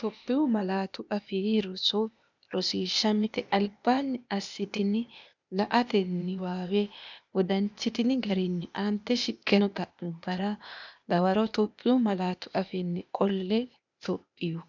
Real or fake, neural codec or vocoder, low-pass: fake; codec, 16 kHz, 0.8 kbps, ZipCodec; 7.2 kHz